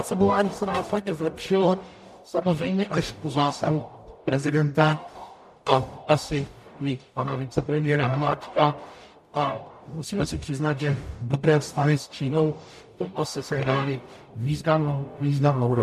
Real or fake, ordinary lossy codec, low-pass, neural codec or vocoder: fake; MP3, 64 kbps; 14.4 kHz; codec, 44.1 kHz, 0.9 kbps, DAC